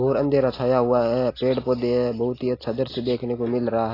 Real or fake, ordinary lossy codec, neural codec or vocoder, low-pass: real; AAC, 24 kbps; none; 5.4 kHz